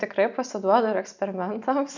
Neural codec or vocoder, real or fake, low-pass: none; real; 7.2 kHz